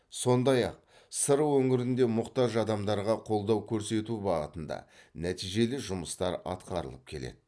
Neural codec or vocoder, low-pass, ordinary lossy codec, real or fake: none; none; none; real